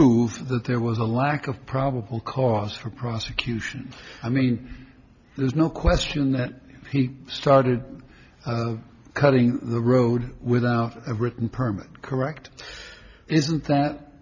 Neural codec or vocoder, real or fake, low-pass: none; real; 7.2 kHz